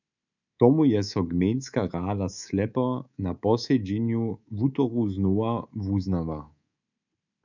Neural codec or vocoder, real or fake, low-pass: codec, 24 kHz, 3.1 kbps, DualCodec; fake; 7.2 kHz